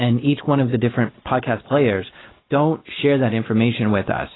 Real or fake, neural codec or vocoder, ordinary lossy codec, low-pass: real; none; AAC, 16 kbps; 7.2 kHz